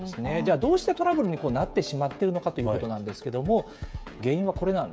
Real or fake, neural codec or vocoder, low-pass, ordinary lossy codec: fake; codec, 16 kHz, 16 kbps, FreqCodec, smaller model; none; none